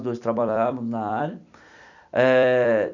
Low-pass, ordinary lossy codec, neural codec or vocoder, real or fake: 7.2 kHz; none; vocoder, 44.1 kHz, 128 mel bands every 256 samples, BigVGAN v2; fake